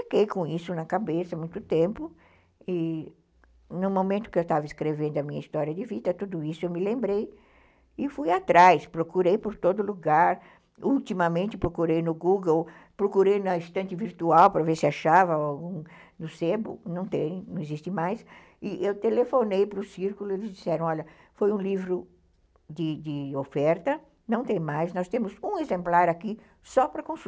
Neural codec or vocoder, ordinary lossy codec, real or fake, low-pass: none; none; real; none